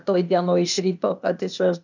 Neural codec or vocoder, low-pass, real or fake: codec, 16 kHz, 0.8 kbps, ZipCodec; 7.2 kHz; fake